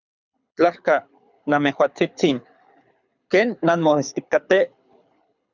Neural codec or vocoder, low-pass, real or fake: codec, 24 kHz, 6 kbps, HILCodec; 7.2 kHz; fake